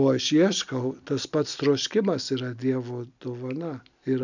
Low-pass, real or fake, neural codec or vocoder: 7.2 kHz; real; none